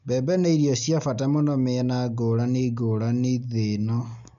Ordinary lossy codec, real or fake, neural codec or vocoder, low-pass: none; real; none; 7.2 kHz